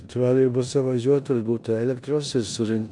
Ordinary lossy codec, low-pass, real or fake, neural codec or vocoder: MP3, 64 kbps; 10.8 kHz; fake; codec, 16 kHz in and 24 kHz out, 0.9 kbps, LongCat-Audio-Codec, four codebook decoder